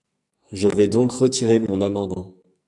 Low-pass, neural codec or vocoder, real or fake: 10.8 kHz; codec, 44.1 kHz, 2.6 kbps, SNAC; fake